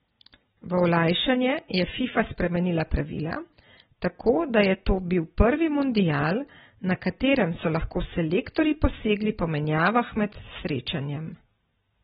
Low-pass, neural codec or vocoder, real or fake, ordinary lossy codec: 19.8 kHz; none; real; AAC, 16 kbps